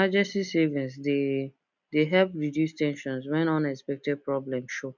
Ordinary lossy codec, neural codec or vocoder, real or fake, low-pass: none; none; real; 7.2 kHz